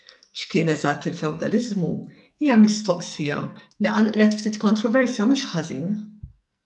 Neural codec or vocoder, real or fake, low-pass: codec, 44.1 kHz, 2.6 kbps, SNAC; fake; 10.8 kHz